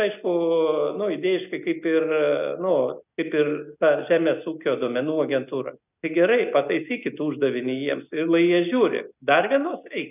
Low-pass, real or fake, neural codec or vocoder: 3.6 kHz; real; none